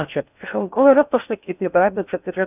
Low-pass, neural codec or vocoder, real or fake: 3.6 kHz; codec, 16 kHz in and 24 kHz out, 0.6 kbps, FocalCodec, streaming, 4096 codes; fake